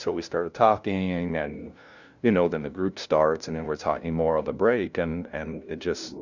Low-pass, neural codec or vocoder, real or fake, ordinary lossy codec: 7.2 kHz; codec, 16 kHz, 0.5 kbps, FunCodec, trained on LibriTTS, 25 frames a second; fake; Opus, 64 kbps